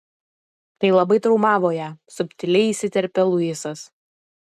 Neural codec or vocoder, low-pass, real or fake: none; 14.4 kHz; real